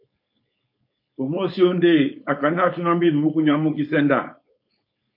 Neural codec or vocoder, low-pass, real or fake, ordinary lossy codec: codec, 16 kHz, 4.8 kbps, FACodec; 5.4 kHz; fake; MP3, 32 kbps